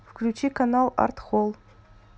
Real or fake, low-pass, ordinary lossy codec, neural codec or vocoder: real; none; none; none